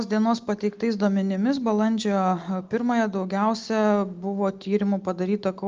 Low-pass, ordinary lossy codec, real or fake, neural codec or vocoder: 7.2 kHz; Opus, 32 kbps; real; none